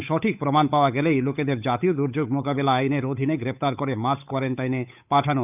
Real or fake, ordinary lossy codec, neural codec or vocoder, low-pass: fake; none; codec, 16 kHz, 8 kbps, FunCodec, trained on Chinese and English, 25 frames a second; 3.6 kHz